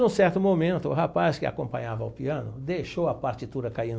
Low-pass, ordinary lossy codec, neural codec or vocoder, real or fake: none; none; none; real